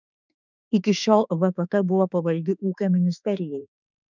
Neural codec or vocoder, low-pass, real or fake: autoencoder, 48 kHz, 32 numbers a frame, DAC-VAE, trained on Japanese speech; 7.2 kHz; fake